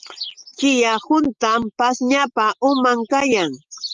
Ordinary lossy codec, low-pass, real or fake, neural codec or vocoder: Opus, 32 kbps; 7.2 kHz; real; none